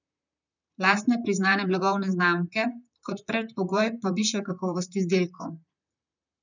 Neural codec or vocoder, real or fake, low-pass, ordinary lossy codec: vocoder, 44.1 kHz, 128 mel bands, Pupu-Vocoder; fake; 7.2 kHz; none